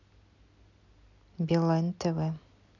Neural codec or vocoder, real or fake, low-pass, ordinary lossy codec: none; real; 7.2 kHz; none